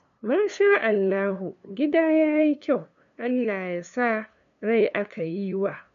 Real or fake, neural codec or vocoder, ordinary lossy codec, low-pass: fake; codec, 16 kHz, 2 kbps, FunCodec, trained on LibriTTS, 25 frames a second; none; 7.2 kHz